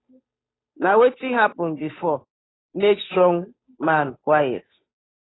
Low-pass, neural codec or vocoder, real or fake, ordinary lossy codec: 7.2 kHz; codec, 16 kHz, 8 kbps, FunCodec, trained on Chinese and English, 25 frames a second; fake; AAC, 16 kbps